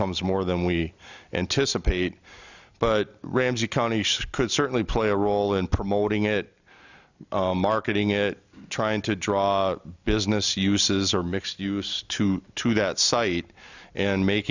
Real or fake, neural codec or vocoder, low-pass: real; none; 7.2 kHz